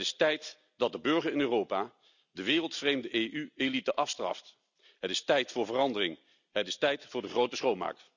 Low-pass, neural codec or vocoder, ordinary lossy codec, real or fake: 7.2 kHz; none; none; real